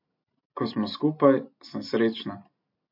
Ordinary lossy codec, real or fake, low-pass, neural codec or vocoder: MP3, 32 kbps; real; 5.4 kHz; none